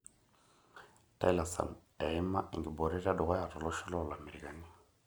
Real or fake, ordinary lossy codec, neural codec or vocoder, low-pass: real; none; none; none